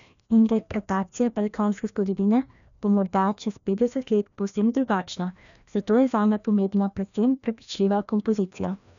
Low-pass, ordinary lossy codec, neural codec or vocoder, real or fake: 7.2 kHz; none; codec, 16 kHz, 1 kbps, FreqCodec, larger model; fake